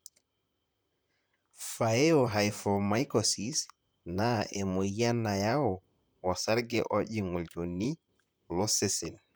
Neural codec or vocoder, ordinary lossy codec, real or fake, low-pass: vocoder, 44.1 kHz, 128 mel bands, Pupu-Vocoder; none; fake; none